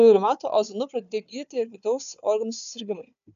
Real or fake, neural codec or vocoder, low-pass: fake; codec, 16 kHz, 4 kbps, FunCodec, trained on Chinese and English, 50 frames a second; 7.2 kHz